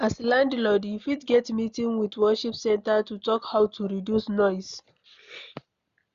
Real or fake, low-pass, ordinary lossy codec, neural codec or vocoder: real; 7.2 kHz; Opus, 64 kbps; none